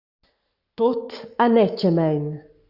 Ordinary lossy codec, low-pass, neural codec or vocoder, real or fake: Opus, 64 kbps; 5.4 kHz; codec, 44.1 kHz, 7.8 kbps, Pupu-Codec; fake